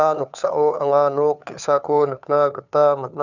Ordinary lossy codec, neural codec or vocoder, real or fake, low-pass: none; codec, 16 kHz, 2 kbps, FunCodec, trained on Chinese and English, 25 frames a second; fake; 7.2 kHz